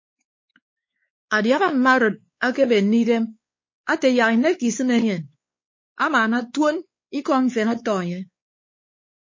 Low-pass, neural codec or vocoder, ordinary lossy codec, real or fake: 7.2 kHz; codec, 16 kHz, 4 kbps, X-Codec, WavLM features, trained on Multilingual LibriSpeech; MP3, 32 kbps; fake